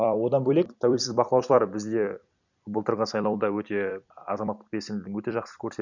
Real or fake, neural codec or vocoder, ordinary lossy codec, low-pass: fake; vocoder, 44.1 kHz, 128 mel bands every 256 samples, BigVGAN v2; none; 7.2 kHz